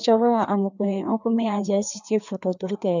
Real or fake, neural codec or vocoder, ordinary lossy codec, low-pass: fake; codec, 16 kHz, 2 kbps, FreqCodec, larger model; none; 7.2 kHz